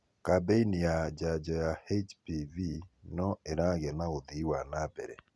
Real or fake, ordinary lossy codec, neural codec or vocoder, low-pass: real; none; none; none